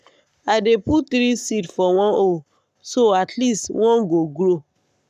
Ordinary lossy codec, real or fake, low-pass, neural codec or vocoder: none; fake; 14.4 kHz; codec, 44.1 kHz, 7.8 kbps, Pupu-Codec